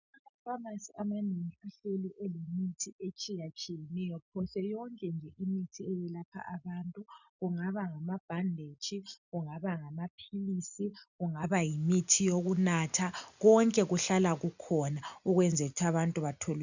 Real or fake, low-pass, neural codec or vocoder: real; 7.2 kHz; none